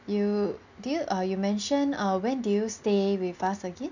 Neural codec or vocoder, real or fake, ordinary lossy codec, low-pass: none; real; none; 7.2 kHz